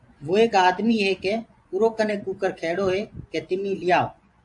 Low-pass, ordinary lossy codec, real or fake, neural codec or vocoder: 10.8 kHz; AAC, 64 kbps; fake; vocoder, 24 kHz, 100 mel bands, Vocos